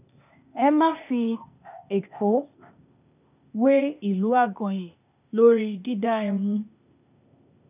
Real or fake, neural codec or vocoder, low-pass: fake; codec, 16 kHz, 0.8 kbps, ZipCodec; 3.6 kHz